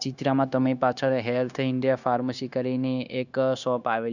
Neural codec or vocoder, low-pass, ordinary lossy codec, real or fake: codec, 16 kHz, 0.9 kbps, LongCat-Audio-Codec; 7.2 kHz; none; fake